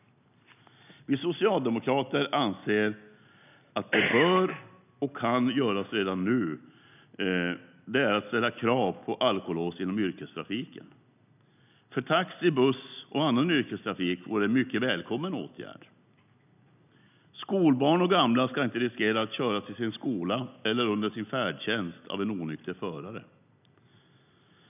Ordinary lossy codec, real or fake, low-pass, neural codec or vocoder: none; real; 3.6 kHz; none